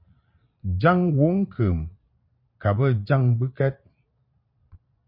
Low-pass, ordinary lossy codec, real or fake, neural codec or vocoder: 5.4 kHz; MP3, 32 kbps; fake; vocoder, 22.05 kHz, 80 mel bands, Vocos